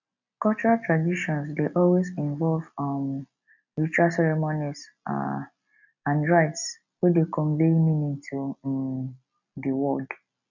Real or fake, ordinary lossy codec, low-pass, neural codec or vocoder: real; none; 7.2 kHz; none